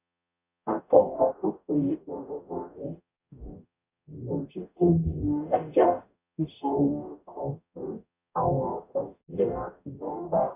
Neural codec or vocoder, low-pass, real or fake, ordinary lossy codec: codec, 44.1 kHz, 0.9 kbps, DAC; 3.6 kHz; fake; none